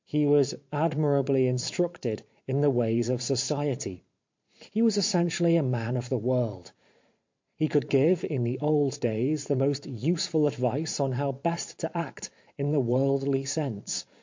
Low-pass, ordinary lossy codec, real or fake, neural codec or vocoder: 7.2 kHz; MP3, 48 kbps; fake; vocoder, 44.1 kHz, 128 mel bands every 512 samples, BigVGAN v2